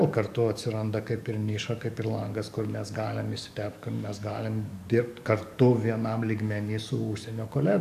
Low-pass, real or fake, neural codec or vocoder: 14.4 kHz; fake; codec, 44.1 kHz, 7.8 kbps, DAC